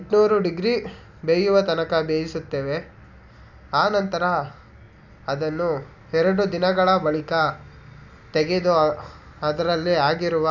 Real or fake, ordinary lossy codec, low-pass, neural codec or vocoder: real; none; 7.2 kHz; none